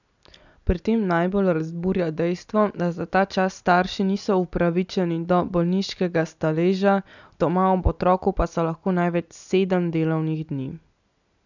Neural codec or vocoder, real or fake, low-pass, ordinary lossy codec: none; real; 7.2 kHz; none